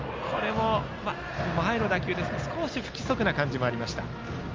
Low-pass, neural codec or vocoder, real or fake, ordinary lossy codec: 7.2 kHz; none; real; Opus, 32 kbps